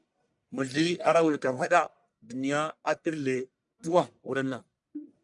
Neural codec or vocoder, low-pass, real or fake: codec, 44.1 kHz, 1.7 kbps, Pupu-Codec; 10.8 kHz; fake